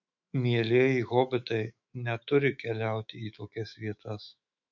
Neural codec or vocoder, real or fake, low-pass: autoencoder, 48 kHz, 128 numbers a frame, DAC-VAE, trained on Japanese speech; fake; 7.2 kHz